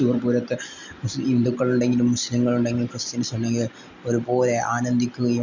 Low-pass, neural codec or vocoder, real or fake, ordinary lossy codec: 7.2 kHz; none; real; Opus, 64 kbps